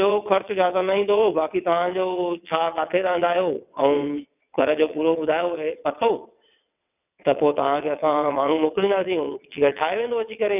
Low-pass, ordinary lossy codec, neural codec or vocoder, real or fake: 3.6 kHz; none; vocoder, 22.05 kHz, 80 mel bands, WaveNeXt; fake